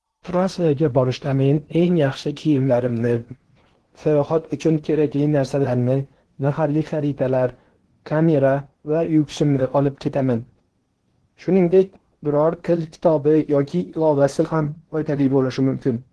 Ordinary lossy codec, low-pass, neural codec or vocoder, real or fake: Opus, 16 kbps; 10.8 kHz; codec, 16 kHz in and 24 kHz out, 0.8 kbps, FocalCodec, streaming, 65536 codes; fake